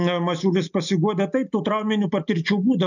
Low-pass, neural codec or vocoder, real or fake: 7.2 kHz; none; real